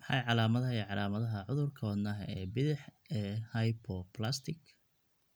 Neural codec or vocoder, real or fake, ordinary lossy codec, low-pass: none; real; none; none